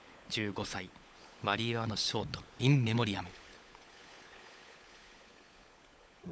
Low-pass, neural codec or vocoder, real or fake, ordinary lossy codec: none; codec, 16 kHz, 16 kbps, FunCodec, trained on LibriTTS, 50 frames a second; fake; none